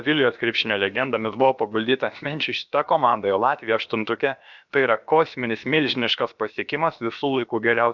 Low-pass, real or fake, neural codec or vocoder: 7.2 kHz; fake; codec, 16 kHz, about 1 kbps, DyCAST, with the encoder's durations